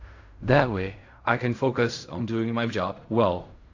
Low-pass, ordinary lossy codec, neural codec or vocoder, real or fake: 7.2 kHz; AAC, 48 kbps; codec, 16 kHz in and 24 kHz out, 0.4 kbps, LongCat-Audio-Codec, fine tuned four codebook decoder; fake